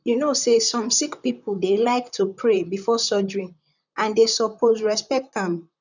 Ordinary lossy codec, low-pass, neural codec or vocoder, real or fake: none; 7.2 kHz; vocoder, 44.1 kHz, 128 mel bands, Pupu-Vocoder; fake